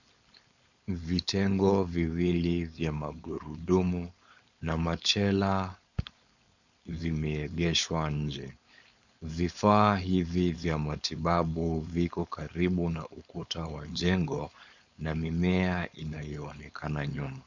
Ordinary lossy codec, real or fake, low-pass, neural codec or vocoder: Opus, 64 kbps; fake; 7.2 kHz; codec, 16 kHz, 4.8 kbps, FACodec